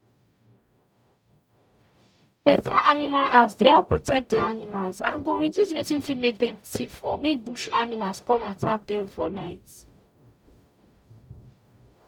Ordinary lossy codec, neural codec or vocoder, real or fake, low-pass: none; codec, 44.1 kHz, 0.9 kbps, DAC; fake; 19.8 kHz